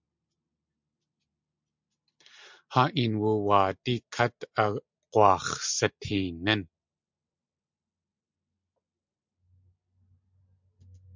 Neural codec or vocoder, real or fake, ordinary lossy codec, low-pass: none; real; MP3, 64 kbps; 7.2 kHz